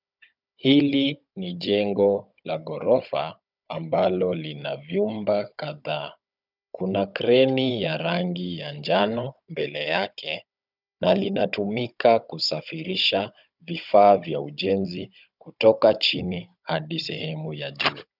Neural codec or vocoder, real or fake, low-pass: codec, 16 kHz, 16 kbps, FunCodec, trained on Chinese and English, 50 frames a second; fake; 5.4 kHz